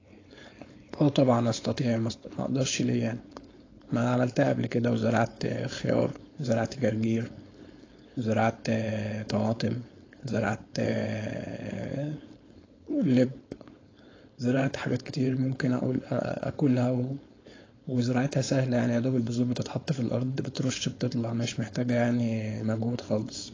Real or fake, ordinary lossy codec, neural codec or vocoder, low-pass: fake; AAC, 32 kbps; codec, 16 kHz, 4.8 kbps, FACodec; 7.2 kHz